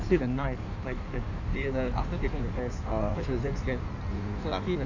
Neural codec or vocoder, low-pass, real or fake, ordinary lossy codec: codec, 16 kHz in and 24 kHz out, 1.1 kbps, FireRedTTS-2 codec; 7.2 kHz; fake; none